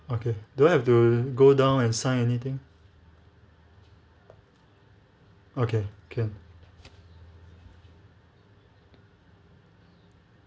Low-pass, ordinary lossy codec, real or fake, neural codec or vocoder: none; none; real; none